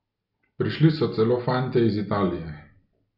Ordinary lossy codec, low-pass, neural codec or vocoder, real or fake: none; 5.4 kHz; none; real